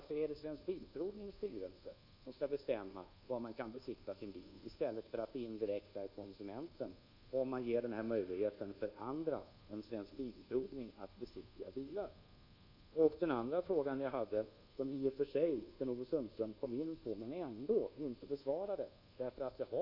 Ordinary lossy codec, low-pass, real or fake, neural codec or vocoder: none; 5.4 kHz; fake; codec, 24 kHz, 1.2 kbps, DualCodec